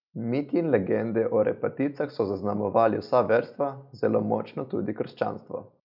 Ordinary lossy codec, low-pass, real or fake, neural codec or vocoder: none; 5.4 kHz; real; none